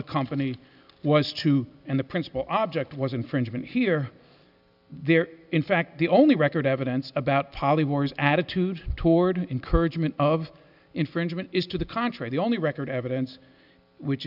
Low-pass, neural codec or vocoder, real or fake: 5.4 kHz; none; real